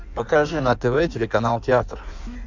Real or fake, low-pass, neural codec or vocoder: fake; 7.2 kHz; codec, 16 kHz in and 24 kHz out, 1.1 kbps, FireRedTTS-2 codec